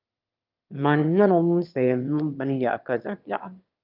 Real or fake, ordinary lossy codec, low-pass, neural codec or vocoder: fake; Opus, 24 kbps; 5.4 kHz; autoencoder, 22.05 kHz, a latent of 192 numbers a frame, VITS, trained on one speaker